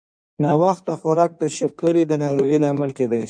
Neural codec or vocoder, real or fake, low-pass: codec, 16 kHz in and 24 kHz out, 1.1 kbps, FireRedTTS-2 codec; fake; 9.9 kHz